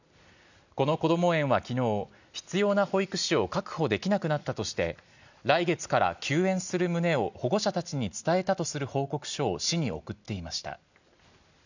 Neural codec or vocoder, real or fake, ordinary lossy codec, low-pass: none; real; none; 7.2 kHz